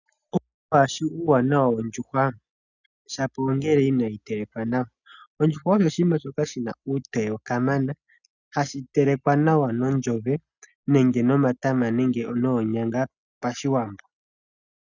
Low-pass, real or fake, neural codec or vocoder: 7.2 kHz; real; none